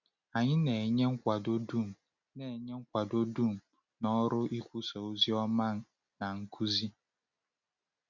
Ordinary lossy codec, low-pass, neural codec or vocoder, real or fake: none; 7.2 kHz; none; real